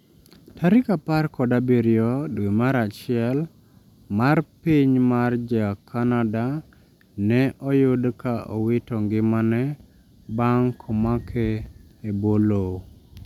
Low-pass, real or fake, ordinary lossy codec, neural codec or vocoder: 19.8 kHz; real; none; none